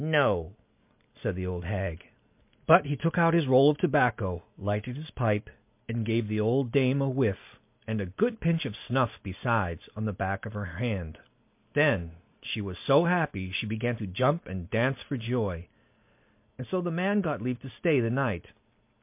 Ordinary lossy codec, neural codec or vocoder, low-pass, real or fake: MP3, 32 kbps; none; 3.6 kHz; real